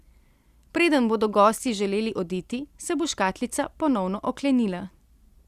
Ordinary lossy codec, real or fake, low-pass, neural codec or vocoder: Opus, 64 kbps; real; 14.4 kHz; none